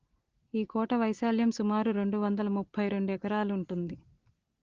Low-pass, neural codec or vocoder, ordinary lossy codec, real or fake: 7.2 kHz; none; Opus, 16 kbps; real